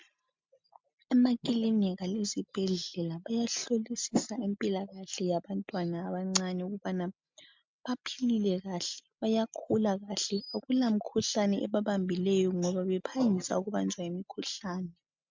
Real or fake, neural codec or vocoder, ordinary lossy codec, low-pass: real; none; MP3, 64 kbps; 7.2 kHz